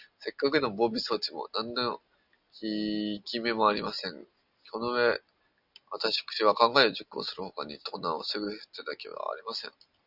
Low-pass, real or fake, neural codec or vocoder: 5.4 kHz; real; none